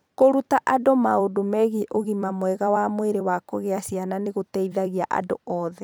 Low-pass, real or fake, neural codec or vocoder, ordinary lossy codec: none; fake; vocoder, 44.1 kHz, 128 mel bands every 256 samples, BigVGAN v2; none